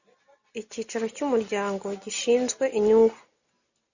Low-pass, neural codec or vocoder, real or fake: 7.2 kHz; none; real